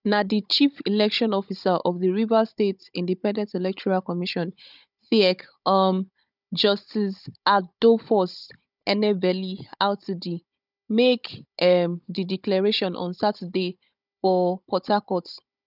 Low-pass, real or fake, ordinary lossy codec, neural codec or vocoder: 5.4 kHz; fake; none; codec, 16 kHz, 16 kbps, FunCodec, trained on Chinese and English, 50 frames a second